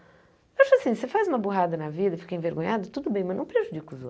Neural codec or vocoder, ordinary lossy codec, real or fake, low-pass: none; none; real; none